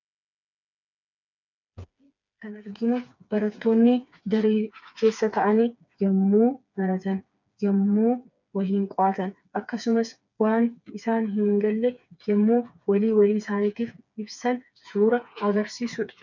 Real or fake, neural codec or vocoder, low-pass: fake; codec, 16 kHz, 4 kbps, FreqCodec, smaller model; 7.2 kHz